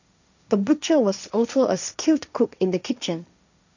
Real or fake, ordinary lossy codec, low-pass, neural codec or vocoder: fake; none; 7.2 kHz; codec, 16 kHz, 1.1 kbps, Voila-Tokenizer